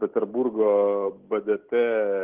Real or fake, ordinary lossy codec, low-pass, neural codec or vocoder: real; Opus, 16 kbps; 3.6 kHz; none